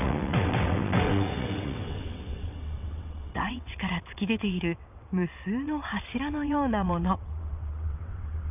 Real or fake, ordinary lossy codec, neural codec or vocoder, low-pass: fake; none; vocoder, 22.05 kHz, 80 mel bands, WaveNeXt; 3.6 kHz